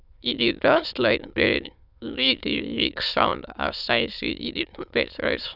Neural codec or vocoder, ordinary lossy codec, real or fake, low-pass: autoencoder, 22.05 kHz, a latent of 192 numbers a frame, VITS, trained on many speakers; none; fake; 5.4 kHz